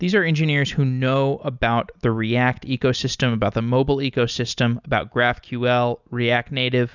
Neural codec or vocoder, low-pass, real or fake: none; 7.2 kHz; real